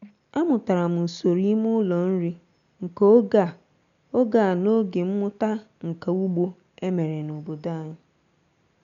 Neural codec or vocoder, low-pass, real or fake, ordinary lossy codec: none; 7.2 kHz; real; none